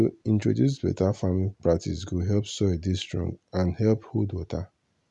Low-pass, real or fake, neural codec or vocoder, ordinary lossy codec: 10.8 kHz; real; none; none